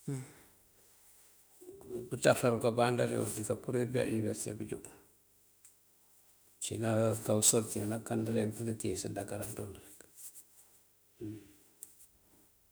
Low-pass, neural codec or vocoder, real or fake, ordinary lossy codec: none; autoencoder, 48 kHz, 32 numbers a frame, DAC-VAE, trained on Japanese speech; fake; none